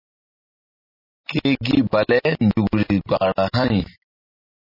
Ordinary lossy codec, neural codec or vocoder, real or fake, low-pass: MP3, 24 kbps; none; real; 5.4 kHz